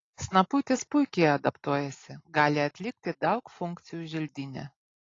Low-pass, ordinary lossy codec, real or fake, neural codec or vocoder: 7.2 kHz; AAC, 32 kbps; real; none